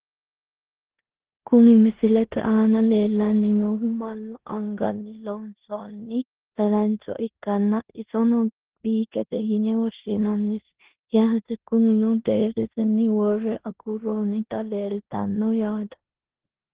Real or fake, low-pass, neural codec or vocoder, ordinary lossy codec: fake; 3.6 kHz; codec, 16 kHz in and 24 kHz out, 0.9 kbps, LongCat-Audio-Codec, four codebook decoder; Opus, 16 kbps